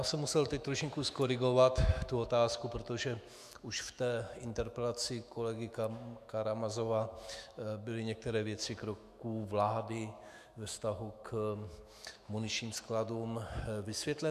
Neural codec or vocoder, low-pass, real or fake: autoencoder, 48 kHz, 128 numbers a frame, DAC-VAE, trained on Japanese speech; 14.4 kHz; fake